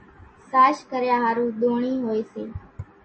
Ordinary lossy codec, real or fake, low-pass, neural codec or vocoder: MP3, 32 kbps; real; 9.9 kHz; none